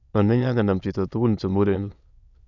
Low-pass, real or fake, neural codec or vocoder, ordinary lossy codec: 7.2 kHz; fake; autoencoder, 22.05 kHz, a latent of 192 numbers a frame, VITS, trained on many speakers; none